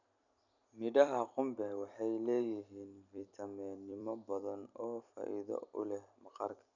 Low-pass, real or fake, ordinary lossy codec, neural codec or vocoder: 7.2 kHz; fake; none; vocoder, 44.1 kHz, 128 mel bands every 256 samples, BigVGAN v2